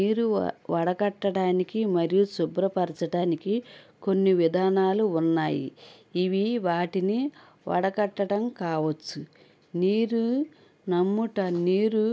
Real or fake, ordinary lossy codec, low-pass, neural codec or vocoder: real; none; none; none